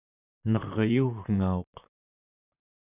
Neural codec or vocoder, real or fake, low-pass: vocoder, 44.1 kHz, 128 mel bands, Pupu-Vocoder; fake; 3.6 kHz